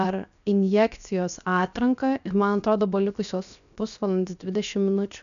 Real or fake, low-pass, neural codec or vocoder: fake; 7.2 kHz; codec, 16 kHz, 0.7 kbps, FocalCodec